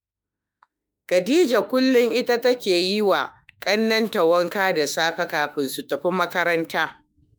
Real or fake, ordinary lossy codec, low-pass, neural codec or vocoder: fake; none; none; autoencoder, 48 kHz, 32 numbers a frame, DAC-VAE, trained on Japanese speech